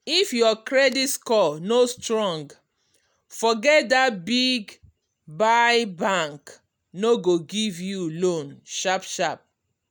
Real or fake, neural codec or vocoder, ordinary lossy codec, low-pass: real; none; none; none